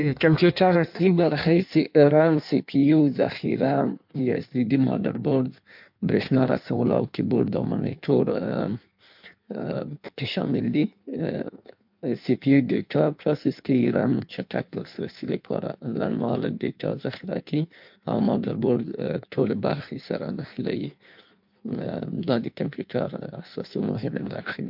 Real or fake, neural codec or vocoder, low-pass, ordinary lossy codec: fake; codec, 16 kHz in and 24 kHz out, 1.1 kbps, FireRedTTS-2 codec; 5.4 kHz; MP3, 48 kbps